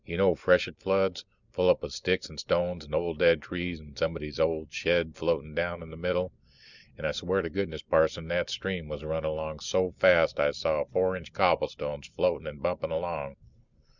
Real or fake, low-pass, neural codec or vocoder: real; 7.2 kHz; none